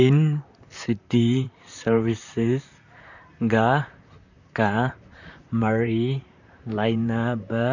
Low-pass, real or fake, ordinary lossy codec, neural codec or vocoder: 7.2 kHz; fake; none; vocoder, 44.1 kHz, 128 mel bands, Pupu-Vocoder